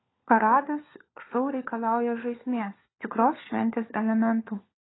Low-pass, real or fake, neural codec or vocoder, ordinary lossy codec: 7.2 kHz; fake; codec, 16 kHz, 4 kbps, FunCodec, trained on LibriTTS, 50 frames a second; AAC, 16 kbps